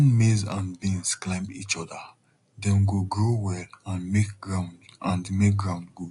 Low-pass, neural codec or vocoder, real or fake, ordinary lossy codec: 10.8 kHz; none; real; AAC, 48 kbps